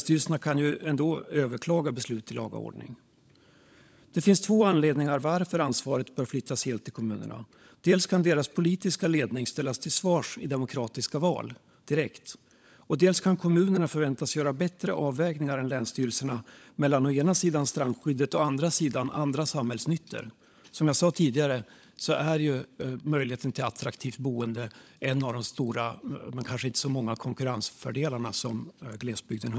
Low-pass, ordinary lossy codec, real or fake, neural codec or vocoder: none; none; fake; codec, 16 kHz, 16 kbps, FunCodec, trained on LibriTTS, 50 frames a second